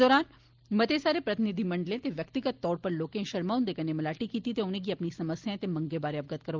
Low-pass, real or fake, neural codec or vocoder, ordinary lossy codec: 7.2 kHz; real; none; Opus, 32 kbps